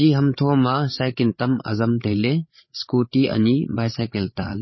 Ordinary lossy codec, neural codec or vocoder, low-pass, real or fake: MP3, 24 kbps; codec, 16 kHz, 8 kbps, FreqCodec, larger model; 7.2 kHz; fake